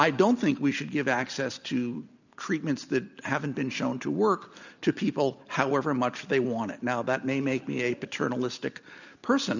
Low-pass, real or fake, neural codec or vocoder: 7.2 kHz; real; none